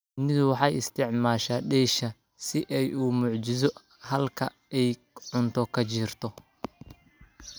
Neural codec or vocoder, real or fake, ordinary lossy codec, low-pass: none; real; none; none